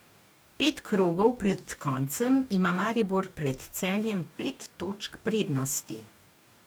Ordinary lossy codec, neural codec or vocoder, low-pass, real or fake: none; codec, 44.1 kHz, 2.6 kbps, DAC; none; fake